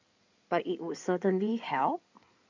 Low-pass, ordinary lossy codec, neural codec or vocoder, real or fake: 7.2 kHz; MP3, 64 kbps; codec, 16 kHz in and 24 kHz out, 2.2 kbps, FireRedTTS-2 codec; fake